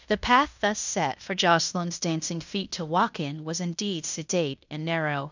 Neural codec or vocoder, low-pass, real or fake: codec, 16 kHz in and 24 kHz out, 0.9 kbps, LongCat-Audio-Codec, fine tuned four codebook decoder; 7.2 kHz; fake